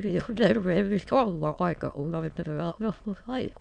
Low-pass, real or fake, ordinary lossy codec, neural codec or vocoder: 9.9 kHz; fake; none; autoencoder, 22.05 kHz, a latent of 192 numbers a frame, VITS, trained on many speakers